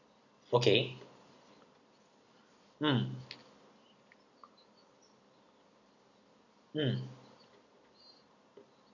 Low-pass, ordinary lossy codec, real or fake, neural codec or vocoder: 7.2 kHz; none; real; none